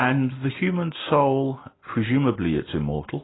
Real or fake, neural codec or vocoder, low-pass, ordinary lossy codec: real; none; 7.2 kHz; AAC, 16 kbps